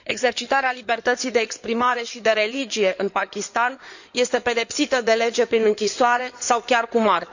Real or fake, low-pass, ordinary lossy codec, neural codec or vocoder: fake; 7.2 kHz; none; codec, 16 kHz in and 24 kHz out, 2.2 kbps, FireRedTTS-2 codec